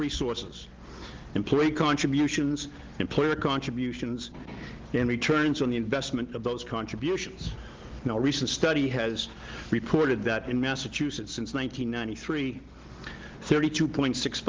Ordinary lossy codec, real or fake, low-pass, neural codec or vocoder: Opus, 16 kbps; real; 7.2 kHz; none